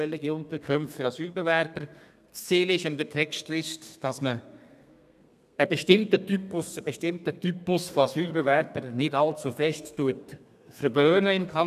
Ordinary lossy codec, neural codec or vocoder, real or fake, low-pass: none; codec, 32 kHz, 1.9 kbps, SNAC; fake; 14.4 kHz